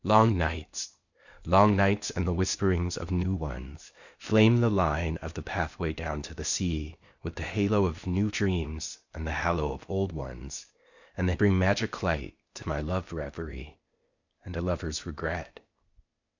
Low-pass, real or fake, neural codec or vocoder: 7.2 kHz; fake; codec, 16 kHz, 0.8 kbps, ZipCodec